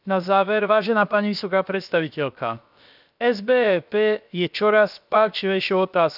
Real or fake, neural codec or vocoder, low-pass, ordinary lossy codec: fake; codec, 16 kHz, about 1 kbps, DyCAST, with the encoder's durations; 5.4 kHz; none